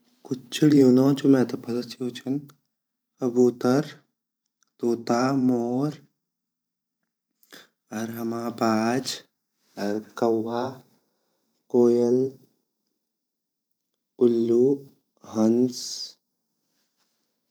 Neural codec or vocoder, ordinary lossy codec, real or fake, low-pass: vocoder, 44.1 kHz, 128 mel bands every 512 samples, BigVGAN v2; none; fake; none